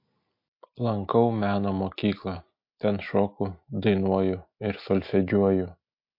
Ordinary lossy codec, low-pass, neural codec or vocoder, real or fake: MP3, 32 kbps; 5.4 kHz; none; real